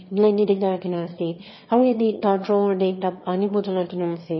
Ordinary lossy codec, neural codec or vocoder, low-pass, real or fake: MP3, 24 kbps; autoencoder, 22.05 kHz, a latent of 192 numbers a frame, VITS, trained on one speaker; 7.2 kHz; fake